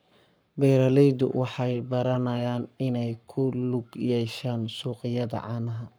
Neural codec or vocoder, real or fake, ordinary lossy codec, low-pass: codec, 44.1 kHz, 7.8 kbps, Pupu-Codec; fake; none; none